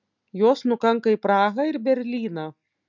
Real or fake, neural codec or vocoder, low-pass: real; none; 7.2 kHz